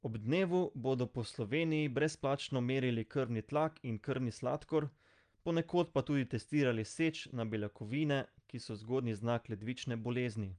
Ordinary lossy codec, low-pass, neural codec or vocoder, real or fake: Opus, 32 kbps; 9.9 kHz; none; real